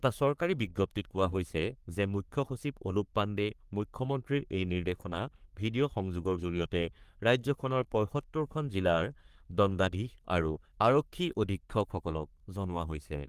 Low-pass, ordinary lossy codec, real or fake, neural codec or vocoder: 14.4 kHz; Opus, 32 kbps; fake; codec, 44.1 kHz, 3.4 kbps, Pupu-Codec